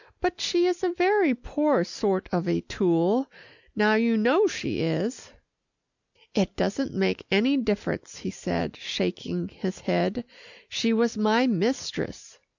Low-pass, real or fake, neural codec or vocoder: 7.2 kHz; real; none